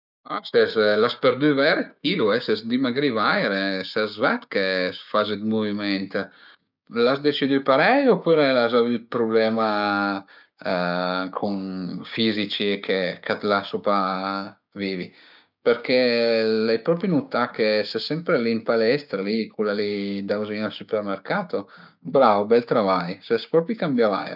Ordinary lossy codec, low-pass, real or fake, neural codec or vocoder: none; 5.4 kHz; fake; codec, 16 kHz, 6 kbps, DAC